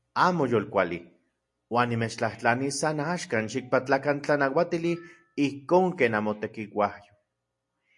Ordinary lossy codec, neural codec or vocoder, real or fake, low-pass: MP3, 48 kbps; none; real; 10.8 kHz